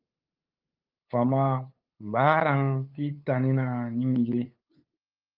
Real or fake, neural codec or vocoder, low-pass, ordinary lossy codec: fake; codec, 16 kHz, 8 kbps, FunCodec, trained on LibriTTS, 25 frames a second; 5.4 kHz; Opus, 24 kbps